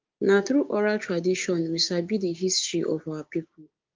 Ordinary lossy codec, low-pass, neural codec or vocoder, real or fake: Opus, 32 kbps; 7.2 kHz; codec, 16 kHz, 6 kbps, DAC; fake